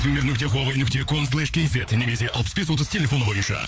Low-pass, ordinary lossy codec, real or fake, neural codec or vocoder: none; none; fake; codec, 16 kHz, 4 kbps, FreqCodec, larger model